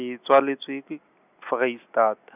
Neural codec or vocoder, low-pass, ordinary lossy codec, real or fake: none; 3.6 kHz; none; real